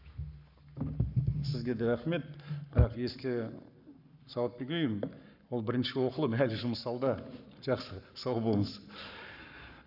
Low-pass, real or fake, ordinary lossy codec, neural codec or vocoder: 5.4 kHz; fake; none; codec, 44.1 kHz, 7.8 kbps, Pupu-Codec